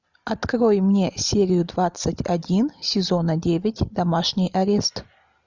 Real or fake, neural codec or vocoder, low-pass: real; none; 7.2 kHz